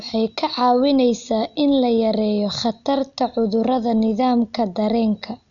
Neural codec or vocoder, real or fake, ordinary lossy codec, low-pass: none; real; none; 7.2 kHz